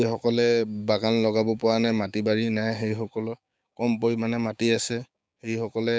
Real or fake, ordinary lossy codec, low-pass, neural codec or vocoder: fake; none; none; codec, 16 kHz, 6 kbps, DAC